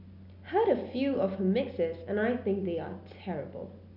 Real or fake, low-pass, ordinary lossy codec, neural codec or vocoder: real; 5.4 kHz; none; none